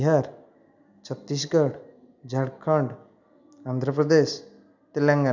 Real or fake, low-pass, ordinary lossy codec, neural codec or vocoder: real; 7.2 kHz; none; none